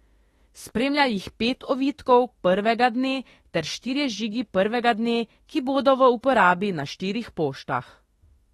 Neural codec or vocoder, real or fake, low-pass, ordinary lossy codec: autoencoder, 48 kHz, 32 numbers a frame, DAC-VAE, trained on Japanese speech; fake; 19.8 kHz; AAC, 32 kbps